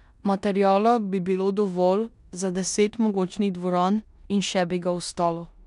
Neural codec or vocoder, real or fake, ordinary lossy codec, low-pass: codec, 16 kHz in and 24 kHz out, 0.9 kbps, LongCat-Audio-Codec, four codebook decoder; fake; none; 10.8 kHz